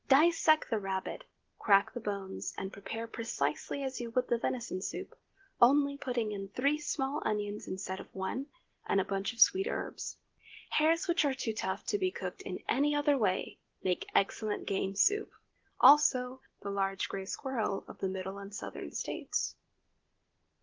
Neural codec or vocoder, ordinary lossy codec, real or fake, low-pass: none; Opus, 16 kbps; real; 7.2 kHz